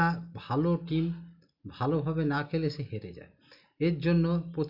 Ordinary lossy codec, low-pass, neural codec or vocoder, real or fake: none; 5.4 kHz; none; real